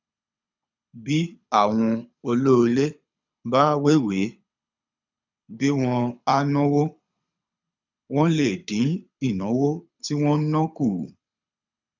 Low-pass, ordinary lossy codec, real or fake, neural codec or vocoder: 7.2 kHz; none; fake; codec, 24 kHz, 6 kbps, HILCodec